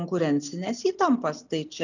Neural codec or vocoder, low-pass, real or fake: none; 7.2 kHz; real